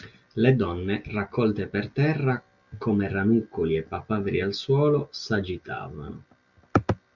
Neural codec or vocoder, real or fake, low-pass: none; real; 7.2 kHz